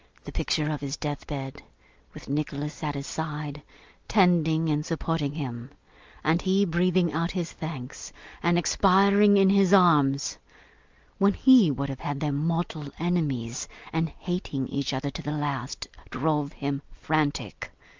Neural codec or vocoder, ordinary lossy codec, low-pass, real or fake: none; Opus, 24 kbps; 7.2 kHz; real